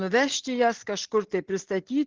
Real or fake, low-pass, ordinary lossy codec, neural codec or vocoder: real; 7.2 kHz; Opus, 16 kbps; none